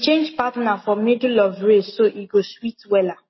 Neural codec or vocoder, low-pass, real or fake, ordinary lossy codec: none; 7.2 kHz; real; MP3, 24 kbps